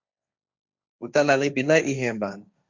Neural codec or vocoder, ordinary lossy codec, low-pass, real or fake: codec, 16 kHz, 1.1 kbps, Voila-Tokenizer; Opus, 64 kbps; 7.2 kHz; fake